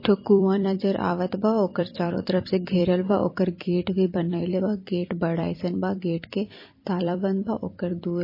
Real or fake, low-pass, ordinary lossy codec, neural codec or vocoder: fake; 5.4 kHz; MP3, 24 kbps; vocoder, 44.1 kHz, 128 mel bands every 256 samples, BigVGAN v2